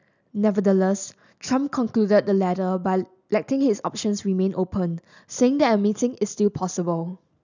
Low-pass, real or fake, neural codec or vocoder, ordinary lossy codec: 7.2 kHz; real; none; none